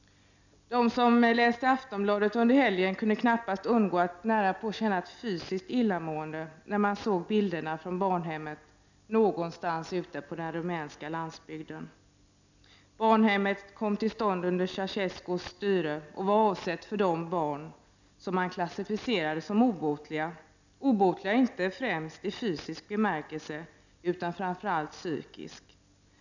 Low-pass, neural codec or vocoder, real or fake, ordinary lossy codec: 7.2 kHz; none; real; none